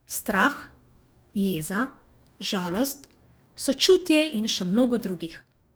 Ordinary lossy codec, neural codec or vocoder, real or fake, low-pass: none; codec, 44.1 kHz, 2.6 kbps, DAC; fake; none